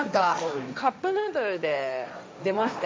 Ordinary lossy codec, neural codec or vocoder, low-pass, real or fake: none; codec, 16 kHz, 1.1 kbps, Voila-Tokenizer; none; fake